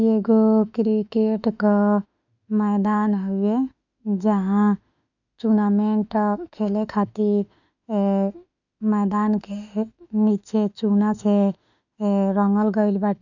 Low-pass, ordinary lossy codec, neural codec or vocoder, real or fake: 7.2 kHz; none; autoencoder, 48 kHz, 32 numbers a frame, DAC-VAE, trained on Japanese speech; fake